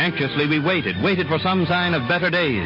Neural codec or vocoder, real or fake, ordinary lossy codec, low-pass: none; real; MP3, 24 kbps; 5.4 kHz